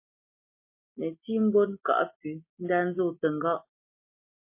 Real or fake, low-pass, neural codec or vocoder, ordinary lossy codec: real; 3.6 kHz; none; MP3, 24 kbps